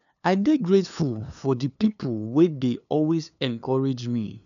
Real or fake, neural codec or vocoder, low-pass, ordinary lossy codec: fake; codec, 16 kHz, 2 kbps, FunCodec, trained on LibriTTS, 25 frames a second; 7.2 kHz; none